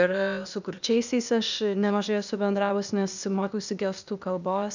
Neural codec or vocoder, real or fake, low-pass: codec, 16 kHz, 0.8 kbps, ZipCodec; fake; 7.2 kHz